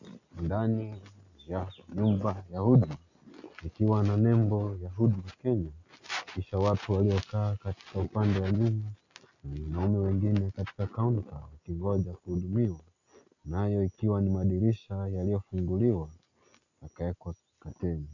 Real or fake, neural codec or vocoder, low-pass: real; none; 7.2 kHz